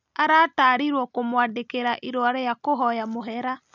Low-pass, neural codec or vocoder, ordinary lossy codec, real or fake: 7.2 kHz; none; none; real